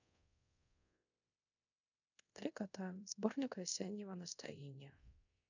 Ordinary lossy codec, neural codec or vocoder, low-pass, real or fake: none; codec, 24 kHz, 0.5 kbps, DualCodec; 7.2 kHz; fake